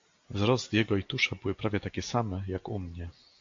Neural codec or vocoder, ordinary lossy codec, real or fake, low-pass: none; AAC, 48 kbps; real; 7.2 kHz